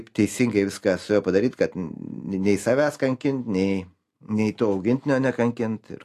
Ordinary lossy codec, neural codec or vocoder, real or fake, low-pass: AAC, 64 kbps; none; real; 14.4 kHz